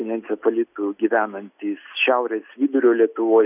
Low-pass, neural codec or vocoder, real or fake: 3.6 kHz; none; real